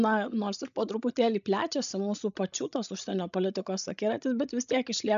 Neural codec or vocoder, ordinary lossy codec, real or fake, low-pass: codec, 16 kHz, 16 kbps, FreqCodec, larger model; AAC, 96 kbps; fake; 7.2 kHz